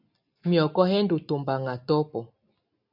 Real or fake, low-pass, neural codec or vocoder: real; 5.4 kHz; none